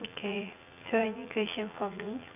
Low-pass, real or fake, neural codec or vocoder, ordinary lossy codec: 3.6 kHz; fake; vocoder, 44.1 kHz, 80 mel bands, Vocos; none